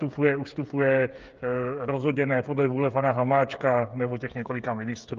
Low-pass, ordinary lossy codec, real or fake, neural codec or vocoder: 7.2 kHz; Opus, 32 kbps; fake; codec, 16 kHz, 8 kbps, FreqCodec, smaller model